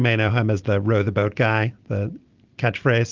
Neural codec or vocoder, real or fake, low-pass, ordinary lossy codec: none; real; 7.2 kHz; Opus, 32 kbps